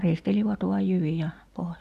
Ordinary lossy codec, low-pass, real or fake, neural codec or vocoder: AAC, 96 kbps; 14.4 kHz; real; none